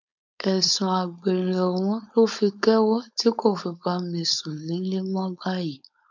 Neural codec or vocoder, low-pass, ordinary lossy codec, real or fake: codec, 16 kHz, 4.8 kbps, FACodec; 7.2 kHz; none; fake